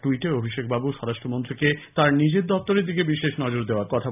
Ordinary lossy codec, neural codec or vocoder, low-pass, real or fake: none; none; 3.6 kHz; real